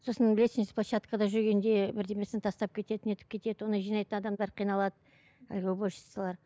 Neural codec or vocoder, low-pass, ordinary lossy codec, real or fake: none; none; none; real